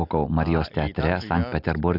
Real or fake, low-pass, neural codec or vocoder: real; 5.4 kHz; none